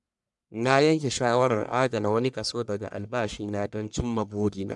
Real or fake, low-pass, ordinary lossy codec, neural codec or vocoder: fake; 10.8 kHz; MP3, 96 kbps; codec, 44.1 kHz, 1.7 kbps, Pupu-Codec